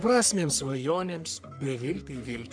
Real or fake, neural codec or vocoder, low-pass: fake; codec, 44.1 kHz, 3.4 kbps, Pupu-Codec; 9.9 kHz